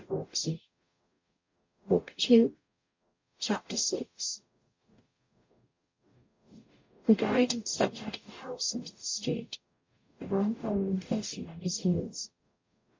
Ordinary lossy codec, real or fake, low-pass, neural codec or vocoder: MP3, 48 kbps; fake; 7.2 kHz; codec, 44.1 kHz, 0.9 kbps, DAC